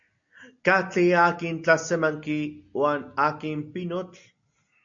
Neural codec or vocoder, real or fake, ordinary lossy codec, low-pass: none; real; AAC, 64 kbps; 7.2 kHz